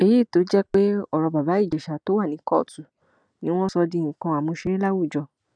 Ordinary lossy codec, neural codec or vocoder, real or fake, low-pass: none; none; real; 9.9 kHz